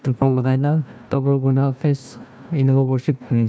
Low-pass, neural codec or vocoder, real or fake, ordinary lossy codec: none; codec, 16 kHz, 1 kbps, FunCodec, trained on Chinese and English, 50 frames a second; fake; none